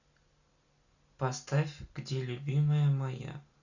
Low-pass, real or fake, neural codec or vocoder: 7.2 kHz; real; none